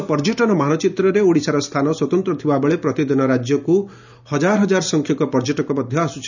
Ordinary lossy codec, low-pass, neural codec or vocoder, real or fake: none; 7.2 kHz; none; real